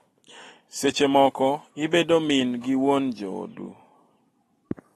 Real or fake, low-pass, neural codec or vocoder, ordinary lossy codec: real; 14.4 kHz; none; AAC, 32 kbps